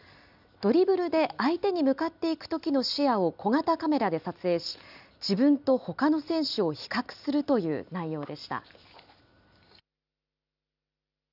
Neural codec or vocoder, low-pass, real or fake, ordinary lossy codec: none; 5.4 kHz; real; none